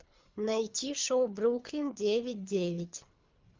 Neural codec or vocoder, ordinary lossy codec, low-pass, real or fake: codec, 24 kHz, 3 kbps, HILCodec; Opus, 32 kbps; 7.2 kHz; fake